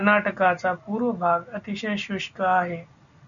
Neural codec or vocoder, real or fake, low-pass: none; real; 7.2 kHz